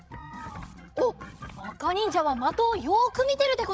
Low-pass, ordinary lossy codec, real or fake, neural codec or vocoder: none; none; fake; codec, 16 kHz, 16 kbps, FreqCodec, larger model